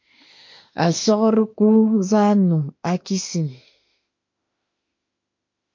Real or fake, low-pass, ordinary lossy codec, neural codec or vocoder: fake; 7.2 kHz; MP3, 48 kbps; autoencoder, 48 kHz, 32 numbers a frame, DAC-VAE, trained on Japanese speech